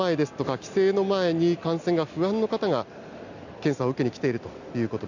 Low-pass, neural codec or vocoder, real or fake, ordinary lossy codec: 7.2 kHz; none; real; none